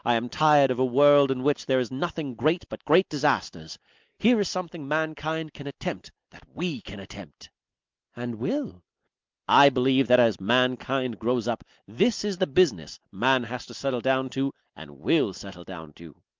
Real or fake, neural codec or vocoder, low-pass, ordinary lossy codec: real; none; 7.2 kHz; Opus, 24 kbps